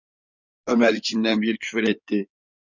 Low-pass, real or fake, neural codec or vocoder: 7.2 kHz; fake; codec, 16 kHz in and 24 kHz out, 2.2 kbps, FireRedTTS-2 codec